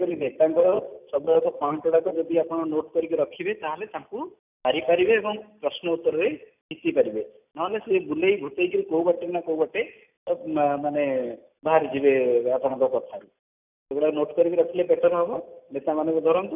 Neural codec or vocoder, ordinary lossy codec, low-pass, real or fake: none; none; 3.6 kHz; real